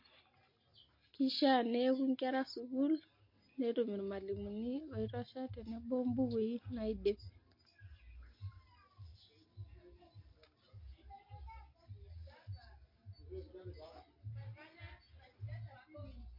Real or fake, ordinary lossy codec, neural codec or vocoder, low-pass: real; MP3, 32 kbps; none; 5.4 kHz